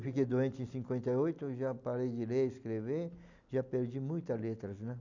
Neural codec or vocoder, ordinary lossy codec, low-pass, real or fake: none; none; 7.2 kHz; real